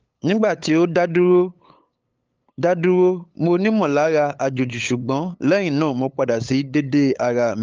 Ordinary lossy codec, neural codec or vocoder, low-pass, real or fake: Opus, 24 kbps; codec, 16 kHz, 8 kbps, FunCodec, trained on LibriTTS, 25 frames a second; 7.2 kHz; fake